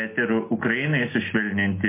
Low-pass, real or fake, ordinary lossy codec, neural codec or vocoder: 3.6 kHz; real; MP3, 24 kbps; none